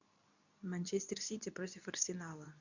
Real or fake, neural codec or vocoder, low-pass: fake; codec, 24 kHz, 0.9 kbps, WavTokenizer, medium speech release version 2; 7.2 kHz